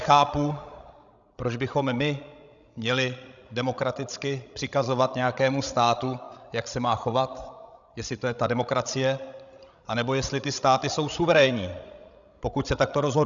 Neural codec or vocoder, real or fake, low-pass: codec, 16 kHz, 16 kbps, FreqCodec, larger model; fake; 7.2 kHz